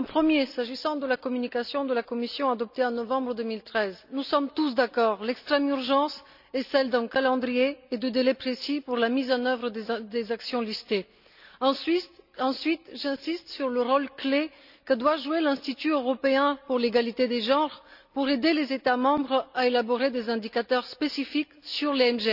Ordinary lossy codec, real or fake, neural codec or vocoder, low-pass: none; real; none; 5.4 kHz